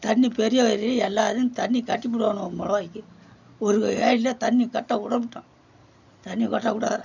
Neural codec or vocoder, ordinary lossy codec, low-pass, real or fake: none; none; 7.2 kHz; real